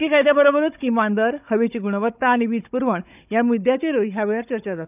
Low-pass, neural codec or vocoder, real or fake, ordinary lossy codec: 3.6 kHz; codec, 16 kHz, 16 kbps, FunCodec, trained on Chinese and English, 50 frames a second; fake; none